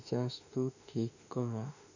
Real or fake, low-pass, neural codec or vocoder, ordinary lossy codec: fake; 7.2 kHz; autoencoder, 48 kHz, 32 numbers a frame, DAC-VAE, trained on Japanese speech; none